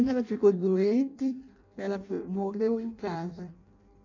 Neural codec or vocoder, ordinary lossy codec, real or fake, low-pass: codec, 16 kHz in and 24 kHz out, 0.6 kbps, FireRedTTS-2 codec; none; fake; 7.2 kHz